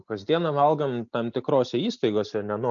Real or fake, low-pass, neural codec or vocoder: real; 7.2 kHz; none